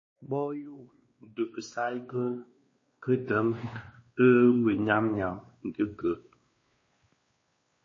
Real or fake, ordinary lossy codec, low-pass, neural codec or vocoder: fake; MP3, 32 kbps; 7.2 kHz; codec, 16 kHz, 2 kbps, X-Codec, WavLM features, trained on Multilingual LibriSpeech